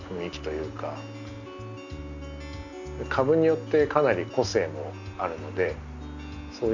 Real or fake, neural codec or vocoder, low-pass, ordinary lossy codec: real; none; 7.2 kHz; none